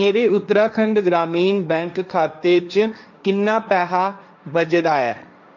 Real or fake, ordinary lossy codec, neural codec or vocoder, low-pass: fake; none; codec, 16 kHz, 1.1 kbps, Voila-Tokenizer; 7.2 kHz